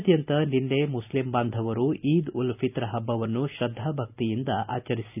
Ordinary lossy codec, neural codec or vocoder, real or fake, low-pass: none; none; real; 3.6 kHz